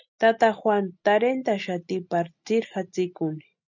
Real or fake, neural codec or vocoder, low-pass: real; none; 7.2 kHz